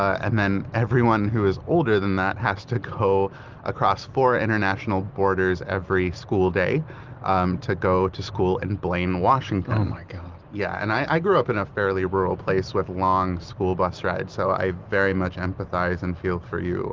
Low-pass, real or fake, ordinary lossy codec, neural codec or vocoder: 7.2 kHz; real; Opus, 32 kbps; none